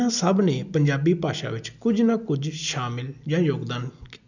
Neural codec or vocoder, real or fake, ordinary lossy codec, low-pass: none; real; none; 7.2 kHz